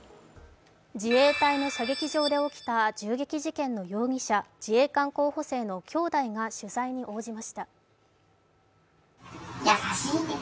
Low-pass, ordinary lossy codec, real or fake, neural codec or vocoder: none; none; real; none